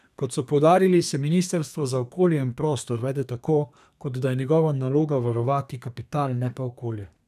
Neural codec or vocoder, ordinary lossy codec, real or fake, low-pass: codec, 32 kHz, 1.9 kbps, SNAC; none; fake; 14.4 kHz